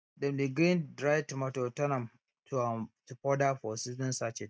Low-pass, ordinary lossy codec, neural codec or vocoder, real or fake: none; none; none; real